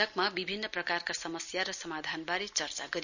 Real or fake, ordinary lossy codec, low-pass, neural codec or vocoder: real; none; 7.2 kHz; none